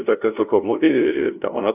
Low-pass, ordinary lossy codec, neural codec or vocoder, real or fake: 3.6 kHz; AAC, 32 kbps; codec, 16 kHz, 0.5 kbps, FunCodec, trained on LibriTTS, 25 frames a second; fake